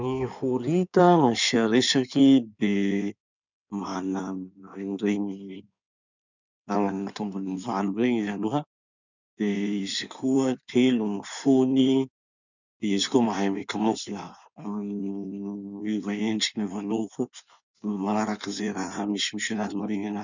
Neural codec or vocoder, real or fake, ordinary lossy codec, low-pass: codec, 16 kHz in and 24 kHz out, 2.2 kbps, FireRedTTS-2 codec; fake; none; 7.2 kHz